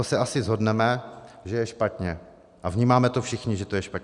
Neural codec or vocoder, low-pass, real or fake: none; 10.8 kHz; real